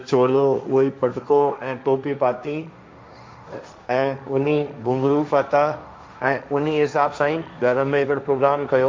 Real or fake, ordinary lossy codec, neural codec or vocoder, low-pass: fake; none; codec, 16 kHz, 1.1 kbps, Voila-Tokenizer; none